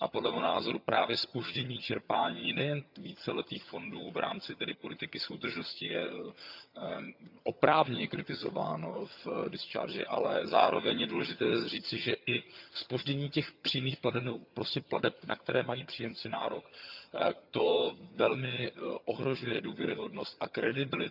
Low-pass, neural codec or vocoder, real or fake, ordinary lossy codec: 5.4 kHz; vocoder, 22.05 kHz, 80 mel bands, HiFi-GAN; fake; none